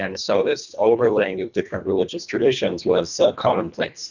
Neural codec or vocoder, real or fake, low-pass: codec, 24 kHz, 1.5 kbps, HILCodec; fake; 7.2 kHz